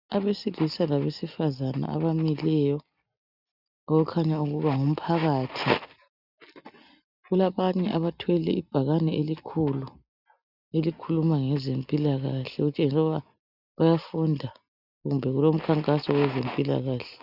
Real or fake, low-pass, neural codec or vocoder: real; 5.4 kHz; none